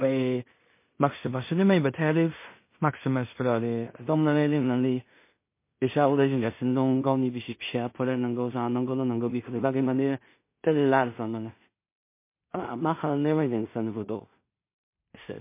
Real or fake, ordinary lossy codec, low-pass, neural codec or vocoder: fake; MP3, 24 kbps; 3.6 kHz; codec, 16 kHz in and 24 kHz out, 0.4 kbps, LongCat-Audio-Codec, two codebook decoder